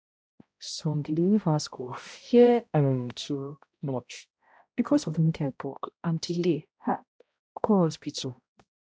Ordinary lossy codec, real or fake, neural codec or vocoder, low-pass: none; fake; codec, 16 kHz, 0.5 kbps, X-Codec, HuBERT features, trained on balanced general audio; none